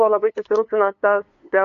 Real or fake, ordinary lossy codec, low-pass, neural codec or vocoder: fake; AAC, 48 kbps; 7.2 kHz; codec, 16 kHz, 2 kbps, FunCodec, trained on LibriTTS, 25 frames a second